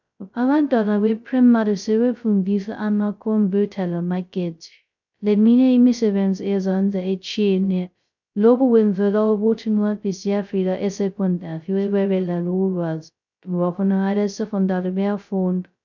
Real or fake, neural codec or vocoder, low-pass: fake; codec, 16 kHz, 0.2 kbps, FocalCodec; 7.2 kHz